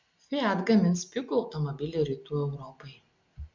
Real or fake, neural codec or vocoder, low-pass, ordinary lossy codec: real; none; 7.2 kHz; MP3, 48 kbps